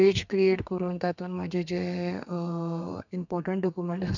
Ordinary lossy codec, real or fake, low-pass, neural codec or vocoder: none; fake; 7.2 kHz; codec, 32 kHz, 1.9 kbps, SNAC